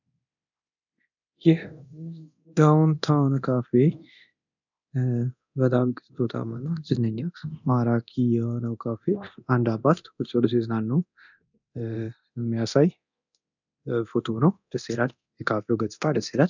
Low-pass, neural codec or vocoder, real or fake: 7.2 kHz; codec, 24 kHz, 0.9 kbps, DualCodec; fake